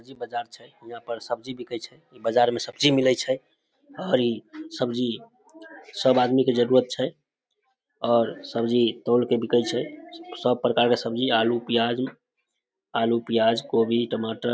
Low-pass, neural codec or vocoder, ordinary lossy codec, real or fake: none; none; none; real